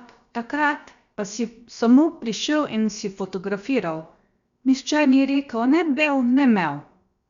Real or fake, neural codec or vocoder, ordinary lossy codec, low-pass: fake; codec, 16 kHz, about 1 kbps, DyCAST, with the encoder's durations; Opus, 64 kbps; 7.2 kHz